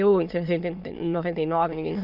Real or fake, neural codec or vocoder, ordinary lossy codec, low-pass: fake; autoencoder, 22.05 kHz, a latent of 192 numbers a frame, VITS, trained on many speakers; none; 5.4 kHz